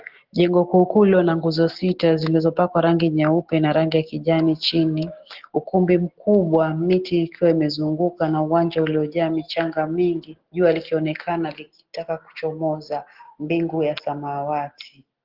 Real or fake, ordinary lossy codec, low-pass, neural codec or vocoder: real; Opus, 16 kbps; 5.4 kHz; none